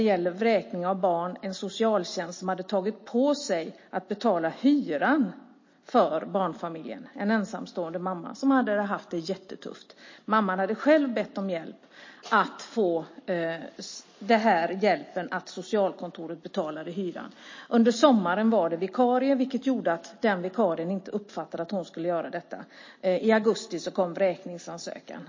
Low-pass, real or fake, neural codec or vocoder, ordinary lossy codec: 7.2 kHz; real; none; MP3, 32 kbps